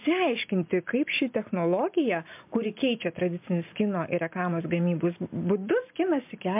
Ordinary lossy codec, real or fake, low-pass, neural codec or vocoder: MP3, 32 kbps; real; 3.6 kHz; none